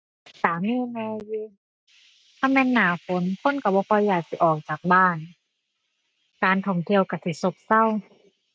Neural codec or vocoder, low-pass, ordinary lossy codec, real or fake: none; none; none; real